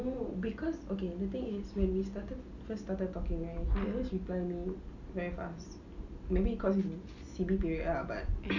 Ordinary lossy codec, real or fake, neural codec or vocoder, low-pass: none; real; none; 7.2 kHz